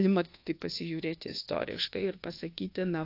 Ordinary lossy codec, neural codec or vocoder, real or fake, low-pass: AAC, 32 kbps; codec, 24 kHz, 1.2 kbps, DualCodec; fake; 5.4 kHz